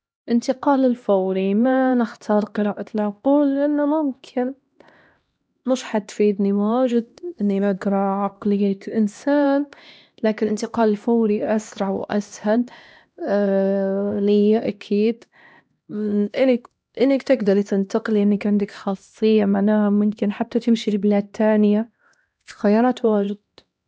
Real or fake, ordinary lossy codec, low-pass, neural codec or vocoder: fake; none; none; codec, 16 kHz, 1 kbps, X-Codec, HuBERT features, trained on LibriSpeech